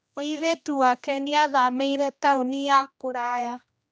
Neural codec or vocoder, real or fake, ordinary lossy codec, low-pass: codec, 16 kHz, 1 kbps, X-Codec, HuBERT features, trained on general audio; fake; none; none